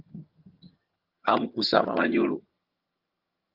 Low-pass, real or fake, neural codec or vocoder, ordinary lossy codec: 5.4 kHz; fake; vocoder, 22.05 kHz, 80 mel bands, HiFi-GAN; Opus, 24 kbps